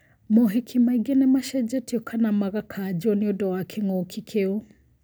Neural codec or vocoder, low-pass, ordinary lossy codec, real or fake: none; none; none; real